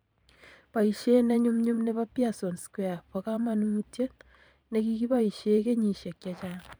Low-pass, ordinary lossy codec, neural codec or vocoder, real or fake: none; none; vocoder, 44.1 kHz, 128 mel bands every 512 samples, BigVGAN v2; fake